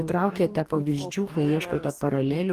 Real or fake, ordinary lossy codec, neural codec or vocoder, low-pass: fake; Opus, 24 kbps; codec, 44.1 kHz, 2.6 kbps, DAC; 14.4 kHz